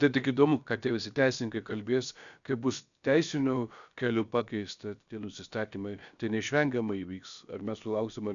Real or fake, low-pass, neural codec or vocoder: fake; 7.2 kHz; codec, 16 kHz, about 1 kbps, DyCAST, with the encoder's durations